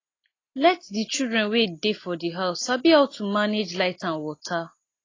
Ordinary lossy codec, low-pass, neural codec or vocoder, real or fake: AAC, 32 kbps; 7.2 kHz; none; real